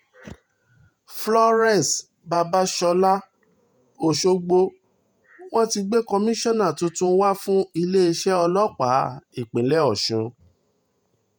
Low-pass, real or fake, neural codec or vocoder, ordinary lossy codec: none; fake; vocoder, 48 kHz, 128 mel bands, Vocos; none